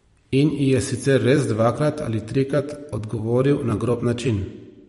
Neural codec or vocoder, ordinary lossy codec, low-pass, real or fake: vocoder, 44.1 kHz, 128 mel bands every 256 samples, BigVGAN v2; MP3, 48 kbps; 19.8 kHz; fake